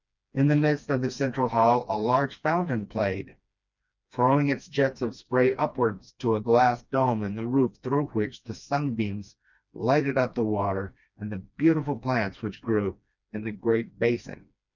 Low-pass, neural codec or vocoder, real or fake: 7.2 kHz; codec, 16 kHz, 2 kbps, FreqCodec, smaller model; fake